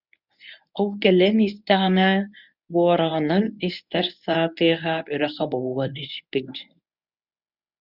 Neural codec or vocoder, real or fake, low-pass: codec, 24 kHz, 0.9 kbps, WavTokenizer, medium speech release version 1; fake; 5.4 kHz